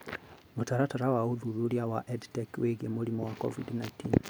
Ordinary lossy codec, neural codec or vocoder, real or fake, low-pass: none; none; real; none